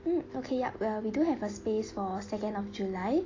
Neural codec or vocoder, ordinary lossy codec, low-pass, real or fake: none; AAC, 32 kbps; 7.2 kHz; real